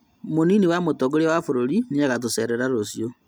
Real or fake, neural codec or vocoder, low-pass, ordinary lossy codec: real; none; none; none